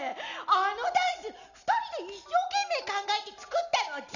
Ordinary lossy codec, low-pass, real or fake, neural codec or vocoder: none; 7.2 kHz; real; none